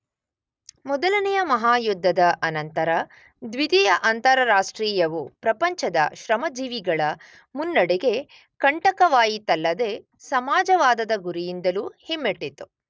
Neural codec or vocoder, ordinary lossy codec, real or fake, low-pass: none; none; real; none